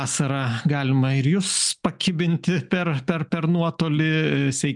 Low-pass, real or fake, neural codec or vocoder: 10.8 kHz; real; none